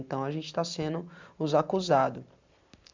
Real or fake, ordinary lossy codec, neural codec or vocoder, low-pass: real; MP3, 64 kbps; none; 7.2 kHz